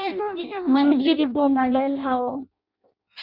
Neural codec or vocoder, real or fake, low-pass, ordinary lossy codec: codec, 16 kHz in and 24 kHz out, 0.6 kbps, FireRedTTS-2 codec; fake; 5.4 kHz; Opus, 64 kbps